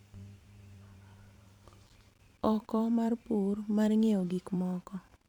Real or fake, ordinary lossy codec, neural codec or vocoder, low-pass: fake; none; vocoder, 44.1 kHz, 128 mel bands every 512 samples, BigVGAN v2; 19.8 kHz